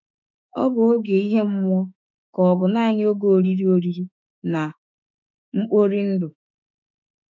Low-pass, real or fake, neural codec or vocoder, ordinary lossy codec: 7.2 kHz; fake; autoencoder, 48 kHz, 32 numbers a frame, DAC-VAE, trained on Japanese speech; MP3, 64 kbps